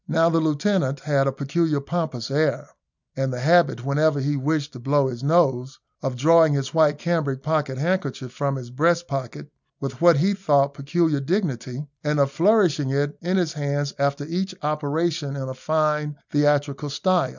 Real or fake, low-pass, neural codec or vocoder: real; 7.2 kHz; none